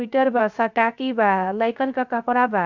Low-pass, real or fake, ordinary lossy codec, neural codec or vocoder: 7.2 kHz; fake; none; codec, 16 kHz, 0.3 kbps, FocalCodec